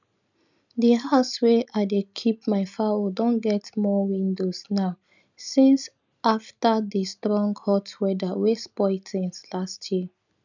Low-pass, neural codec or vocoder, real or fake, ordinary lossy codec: 7.2 kHz; none; real; none